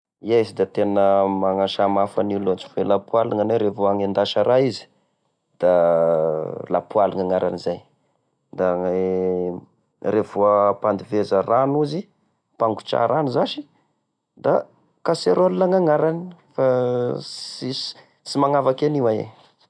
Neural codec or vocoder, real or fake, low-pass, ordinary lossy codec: none; real; 9.9 kHz; none